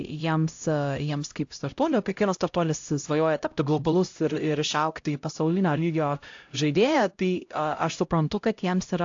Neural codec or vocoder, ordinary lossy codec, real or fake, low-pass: codec, 16 kHz, 0.5 kbps, X-Codec, HuBERT features, trained on LibriSpeech; AAC, 48 kbps; fake; 7.2 kHz